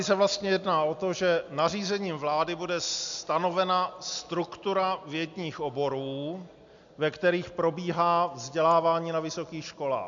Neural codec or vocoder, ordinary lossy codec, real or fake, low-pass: none; MP3, 64 kbps; real; 7.2 kHz